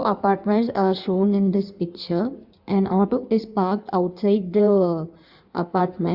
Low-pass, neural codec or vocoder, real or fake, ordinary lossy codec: 5.4 kHz; codec, 16 kHz in and 24 kHz out, 1.1 kbps, FireRedTTS-2 codec; fake; Opus, 64 kbps